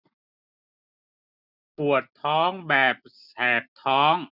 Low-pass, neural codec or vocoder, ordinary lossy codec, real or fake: 5.4 kHz; none; none; real